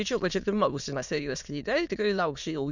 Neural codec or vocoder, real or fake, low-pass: autoencoder, 22.05 kHz, a latent of 192 numbers a frame, VITS, trained on many speakers; fake; 7.2 kHz